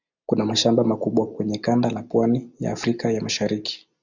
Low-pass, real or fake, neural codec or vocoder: 7.2 kHz; real; none